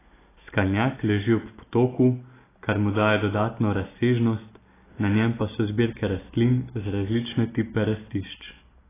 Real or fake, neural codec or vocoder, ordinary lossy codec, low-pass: real; none; AAC, 16 kbps; 3.6 kHz